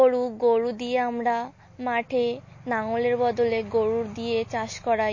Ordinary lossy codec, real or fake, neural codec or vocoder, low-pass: MP3, 32 kbps; real; none; 7.2 kHz